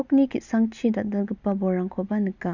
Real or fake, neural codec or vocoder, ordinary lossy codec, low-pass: real; none; none; 7.2 kHz